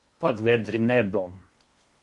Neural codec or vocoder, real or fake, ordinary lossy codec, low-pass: codec, 16 kHz in and 24 kHz out, 0.8 kbps, FocalCodec, streaming, 65536 codes; fake; MP3, 48 kbps; 10.8 kHz